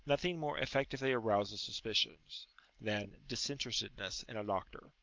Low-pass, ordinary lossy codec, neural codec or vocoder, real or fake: 7.2 kHz; Opus, 24 kbps; none; real